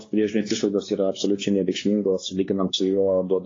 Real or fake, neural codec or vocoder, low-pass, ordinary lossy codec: fake; codec, 16 kHz, 2 kbps, X-Codec, WavLM features, trained on Multilingual LibriSpeech; 7.2 kHz; AAC, 32 kbps